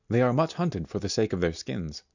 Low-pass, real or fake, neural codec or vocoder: 7.2 kHz; real; none